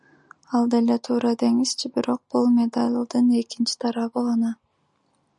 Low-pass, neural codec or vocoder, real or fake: 10.8 kHz; none; real